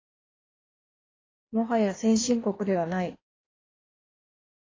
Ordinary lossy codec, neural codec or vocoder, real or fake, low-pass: AAC, 32 kbps; codec, 16 kHz in and 24 kHz out, 1.1 kbps, FireRedTTS-2 codec; fake; 7.2 kHz